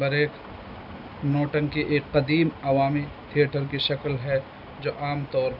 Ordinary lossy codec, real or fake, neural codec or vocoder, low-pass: none; real; none; 5.4 kHz